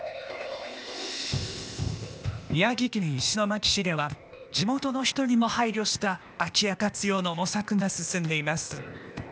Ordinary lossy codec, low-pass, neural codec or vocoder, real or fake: none; none; codec, 16 kHz, 0.8 kbps, ZipCodec; fake